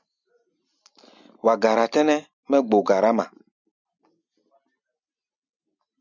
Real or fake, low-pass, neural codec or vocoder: real; 7.2 kHz; none